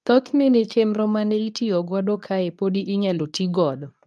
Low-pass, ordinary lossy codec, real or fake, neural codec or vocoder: none; none; fake; codec, 24 kHz, 0.9 kbps, WavTokenizer, medium speech release version 2